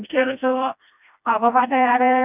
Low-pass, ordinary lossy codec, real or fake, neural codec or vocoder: 3.6 kHz; none; fake; codec, 16 kHz, 1 kbps, FreqCodec, smaller model